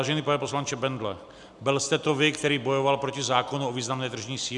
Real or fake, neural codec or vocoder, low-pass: real; none; 10.8 kHz